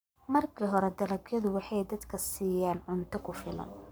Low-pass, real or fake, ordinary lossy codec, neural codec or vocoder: none; fake; none; codec, 44.1 kHz, 7.8 kbps, Pupu-Codec